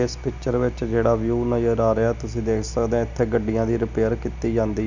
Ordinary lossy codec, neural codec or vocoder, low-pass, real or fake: none; none; 7.2 kHz; real